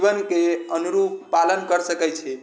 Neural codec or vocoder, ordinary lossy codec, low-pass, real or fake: none; none; none; real